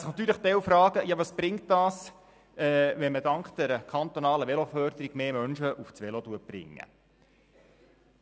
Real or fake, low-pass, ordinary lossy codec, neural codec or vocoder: real; none; none; none